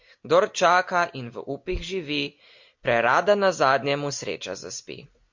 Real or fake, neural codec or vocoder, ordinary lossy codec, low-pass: real; none; MP3, 48 kbps; 7.2 kHz